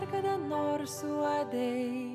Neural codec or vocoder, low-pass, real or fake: none; 14.4 kHz; real